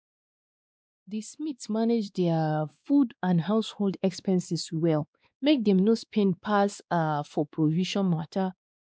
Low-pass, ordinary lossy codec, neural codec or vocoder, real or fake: none; none; codec, 16 kHz, 2 kbps, X-Codec, WavLM features, trained on Multilingual LibriSpeech; fake